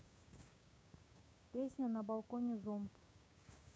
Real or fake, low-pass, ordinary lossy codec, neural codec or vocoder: fake; none; none; codec, 16 kHz, 6 kbps, DAC